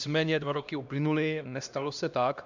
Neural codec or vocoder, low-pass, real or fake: codec, 16 kHz, 1 kbps, X-Codec, HuBERT features, trained on LibriSpeech; 7.2 kHz; fake